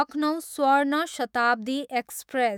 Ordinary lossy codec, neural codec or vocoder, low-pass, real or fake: none; autoencoder, 48 kHz, 128 numbers a frame, DAC-VAE, trained on Japanese speech; none; fake